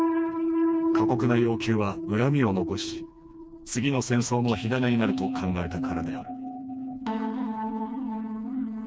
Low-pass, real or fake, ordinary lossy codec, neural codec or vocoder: none; fake; none; codec, 16 kHz, 2 kbps, FreqCodec, smaller model